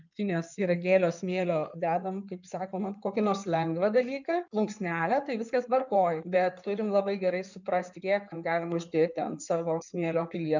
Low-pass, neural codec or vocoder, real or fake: 7.2 kHz; codec, 16 kHz in and 24 kHz out, 2.2 kbps, FireRedTTS-2 codec; fake